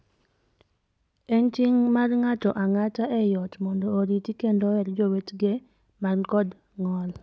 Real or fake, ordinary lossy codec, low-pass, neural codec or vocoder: real; none; none; none